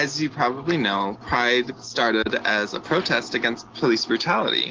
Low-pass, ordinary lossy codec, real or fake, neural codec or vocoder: 7.2 kHz; Opus, 16 kbps; real; none